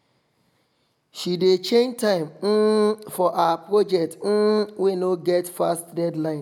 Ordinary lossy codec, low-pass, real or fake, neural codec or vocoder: none; 19.8 kHz; real; none